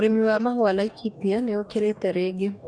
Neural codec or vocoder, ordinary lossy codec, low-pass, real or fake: codec, 44.1 kHz, 2.6 kbps, DAC; none; 9.9 kHz; fake